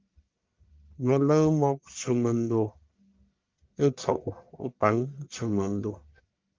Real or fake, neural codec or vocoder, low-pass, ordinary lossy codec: fake; codec, 44.1 kHz, 1.7 kbps, Pupu-Codec; 7.2 kHz; Opus, 24 kbps